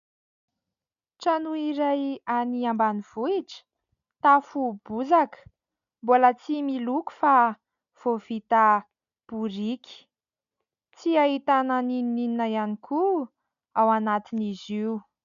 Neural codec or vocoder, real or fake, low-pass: none; real; 7.2 kHz